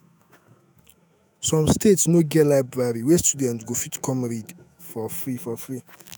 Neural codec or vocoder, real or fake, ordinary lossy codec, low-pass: autoencoder, 48 kHz, 128 numbers a frame, DAC-VAE, trained on Japanese speech; fake; none; none